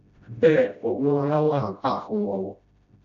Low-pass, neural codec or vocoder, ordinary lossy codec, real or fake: 7.2 kHz; codec, 16 kHz, 0.5 kbps, FreqCodec, smaller model; none; fake